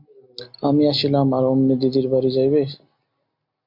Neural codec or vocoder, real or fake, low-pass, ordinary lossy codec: none; real; 5.4 kHz; MP3, 48 kbps